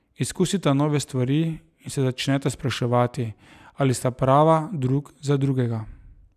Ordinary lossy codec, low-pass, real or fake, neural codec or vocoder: none; 14.4 kHz; real; none